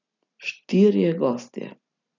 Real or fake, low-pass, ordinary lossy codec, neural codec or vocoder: real; 7.2 kHz; none; none